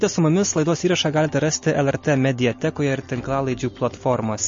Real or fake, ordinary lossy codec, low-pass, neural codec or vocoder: real; MP3, 32 kbps; 7.2 kHz; none